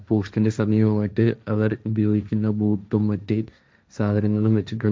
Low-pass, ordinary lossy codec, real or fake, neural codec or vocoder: none; none; fake; codec, 16 kHz, 1.1 kbps, Voila-Tokenizer